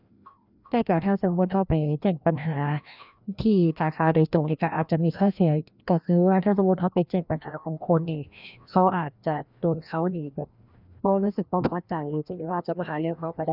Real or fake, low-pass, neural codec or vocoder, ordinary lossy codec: fake; 5.4 kHz; codec, 16 kHz, 1 kbps, FreqCodec, larger model; none